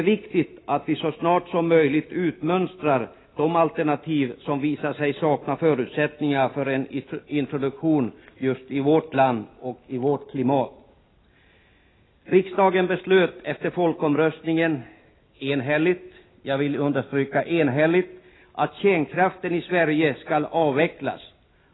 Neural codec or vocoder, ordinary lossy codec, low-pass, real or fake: none; AAC, 16 kbps; 7.2 kHz; real